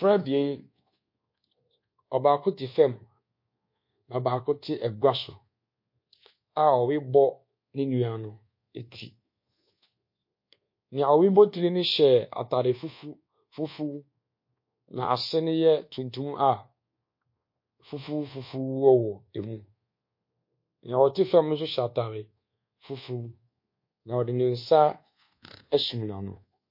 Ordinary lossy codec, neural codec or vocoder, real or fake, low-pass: MP3, 32 kbps; codec, 24 kHz, 1.2 kbps, DualCodec; fake; 5.4 kHz